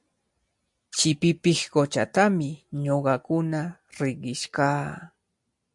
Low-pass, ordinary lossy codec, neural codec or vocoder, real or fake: 10.8 kHz; MP3, 64 kbps; none; real